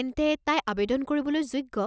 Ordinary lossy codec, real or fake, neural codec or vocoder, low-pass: none; real; none; none